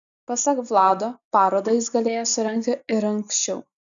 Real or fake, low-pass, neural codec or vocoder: real; 7.2 kHz; none